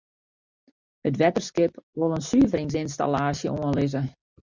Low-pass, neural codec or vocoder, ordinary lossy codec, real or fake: 7.2 kHz; vocoder, 44.1 kHz, 128 mel bands every 256 samples, BigVGAN v2; Opus, 64 kbps; fake